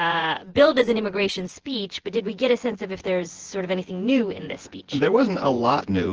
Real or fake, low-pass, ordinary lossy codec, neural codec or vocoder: fake; 7.2 kHz; Opus, 16 kbps; vocoder, 24 kHz, 100 mel bands, Vocos